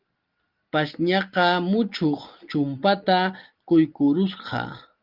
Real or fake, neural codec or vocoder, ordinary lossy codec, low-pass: real; none; Opus, 32 kbps; 5.4 kHz